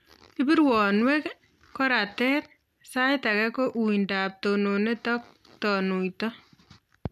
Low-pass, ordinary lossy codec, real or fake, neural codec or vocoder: 14.4 kHz; none; real; none